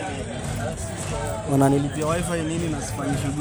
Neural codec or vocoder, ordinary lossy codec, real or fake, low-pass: none; none; real; none